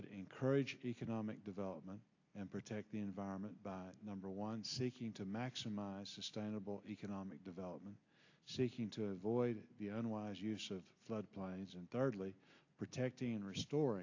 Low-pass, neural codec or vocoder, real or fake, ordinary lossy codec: 7.2 kHz; none; real; AAC, 48 kbps